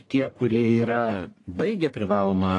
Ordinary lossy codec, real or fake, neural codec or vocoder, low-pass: AAC, 48 kbps; fake; codec, 44.1 kHz, 1.7 kbps, Pupu-Codec; 10.8 kHz